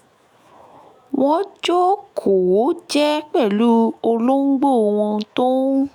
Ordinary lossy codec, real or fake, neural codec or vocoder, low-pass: none; fake; autoencoder, 48 kHz, 128 numbers a frame, DAC-VAE, trained on Japanese speech; 19.8 kHz